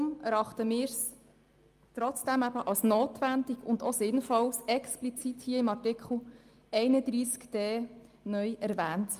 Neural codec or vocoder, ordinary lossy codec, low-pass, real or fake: none; Opus, 32 kbps; 14.4 kHz; real